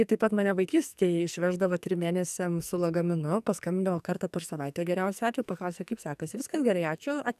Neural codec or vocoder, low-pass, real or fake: codec, 44.1 kHz, 2.6 kbps, SNAC; 14.4 kHz; fake